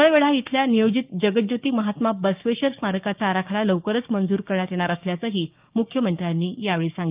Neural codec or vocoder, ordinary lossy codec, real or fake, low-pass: codec, 16 kHz, 6 kbps, DAC; Opus, 24 kbps; fake; 3.6 kHz